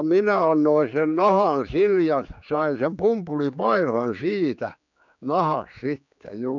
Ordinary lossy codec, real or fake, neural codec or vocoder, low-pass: none; fake; codec, 16 kHz, 4 kbps, X-Codec, HuBERT features, trained on general audio; 7.2 kHz